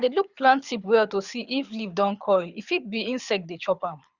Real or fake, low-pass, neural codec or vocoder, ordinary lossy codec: fake; 7.2 kHz; codec, 24 kHz, 6 kbps, HILCodec; Opus, 64 kbps